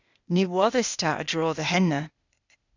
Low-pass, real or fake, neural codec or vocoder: 7.2 kHz; fake; codec, 16 kHz, 0.8 kbps, ZipCodec